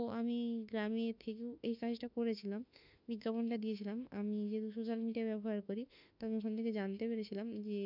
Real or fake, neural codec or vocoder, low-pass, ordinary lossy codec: fake; autoencoder, 48 kHz, 32 numbers a frame, DAC-VAE, trained on Japanese speech; 5.4 kHz; none